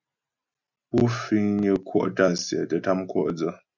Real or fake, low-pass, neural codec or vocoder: real; 7.2 kHz; none